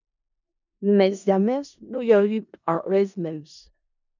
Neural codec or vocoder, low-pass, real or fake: codec, 16 kHz in and 24 kHz out, 0.4 kbps, LongCat-Audio-Codec, four codebook decoder; 7.2 kHz; fake